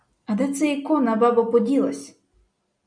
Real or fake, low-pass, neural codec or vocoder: real; 9.9 kHz; none